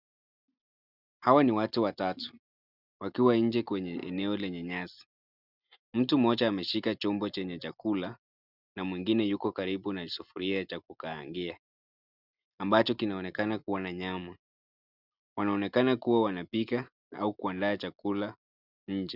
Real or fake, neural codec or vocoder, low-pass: real; none; 5.4 kHz